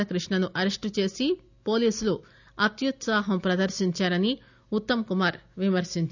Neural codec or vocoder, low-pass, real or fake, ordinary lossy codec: none; 7.2 kHz; real; none